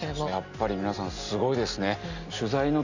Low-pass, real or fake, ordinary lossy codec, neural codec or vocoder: 7.2 kHz; real; none; none